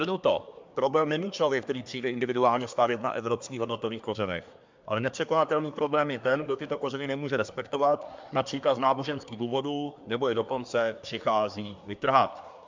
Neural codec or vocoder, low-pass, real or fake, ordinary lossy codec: codec, 24 kHz, 1 kbps, SNAC; 7.2 kHz; fake; MP3, 64 kbps